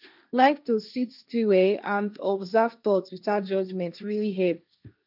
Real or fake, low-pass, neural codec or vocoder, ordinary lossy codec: fake; 5.4 kHz; codec, 16 kHz, 1.1 kbps, Voila-Tokenizer; none